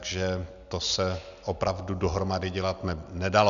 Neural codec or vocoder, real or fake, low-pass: none; real; 7.2 kHz